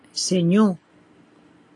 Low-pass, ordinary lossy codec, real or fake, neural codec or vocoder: 10.8 kHz; AAC, 48 kbps; real; none